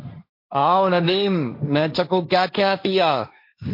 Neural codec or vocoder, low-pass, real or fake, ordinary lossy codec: codec, 16 kHz, 1.1 kbps, Voila-Tokenizer; 5.4 kHz; fake; MP3, 32 kbps